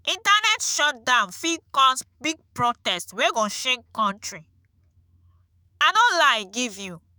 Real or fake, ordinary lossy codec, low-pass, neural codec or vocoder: fake; none; none; autoencoder, 48 kHz, 128 numbers a frame, DAC-VAE, trained on Japanese speech